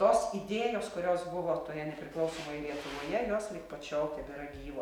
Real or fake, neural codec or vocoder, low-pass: real; none; 19.8 kHz